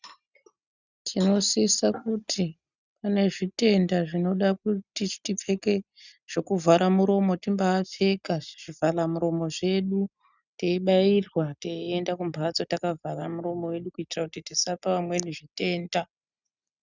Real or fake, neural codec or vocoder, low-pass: real; none; 7.2 kHz